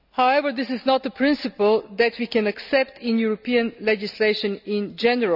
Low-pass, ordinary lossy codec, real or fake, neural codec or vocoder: 5.4 kHz; none; real; none